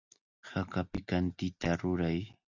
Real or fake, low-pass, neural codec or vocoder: real; 7.2 kHz; none